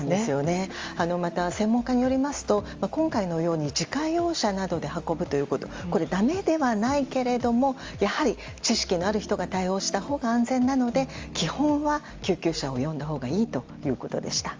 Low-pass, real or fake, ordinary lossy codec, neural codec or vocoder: 7.2 kHz; real; Opus, 32 kbps; none